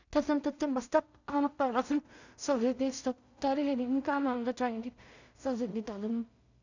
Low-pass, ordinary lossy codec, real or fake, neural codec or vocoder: 7.2 kHz; none; fake; codec, 16 kHz in and 24 kHz out, 0.4 kbps, LongCat-Audio-Codec, two codebook decoder